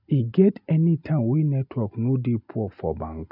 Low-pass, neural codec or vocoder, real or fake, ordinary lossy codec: 5.4 kHz; none; real; none